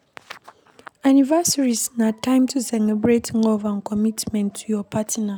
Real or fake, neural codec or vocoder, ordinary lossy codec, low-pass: real; none; none; none